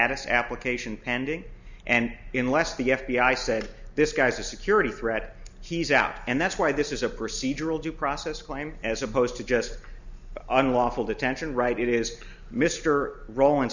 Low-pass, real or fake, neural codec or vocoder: 7.2 kHz; real; none